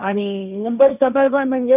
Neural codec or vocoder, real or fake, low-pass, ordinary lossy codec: codec, 16 kHz, 1.1 kbps, Voila-Tokenizer; fake; 3.6 kHz; none